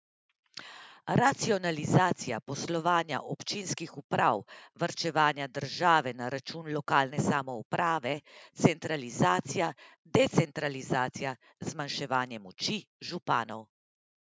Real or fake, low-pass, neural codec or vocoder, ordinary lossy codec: real; none; none; none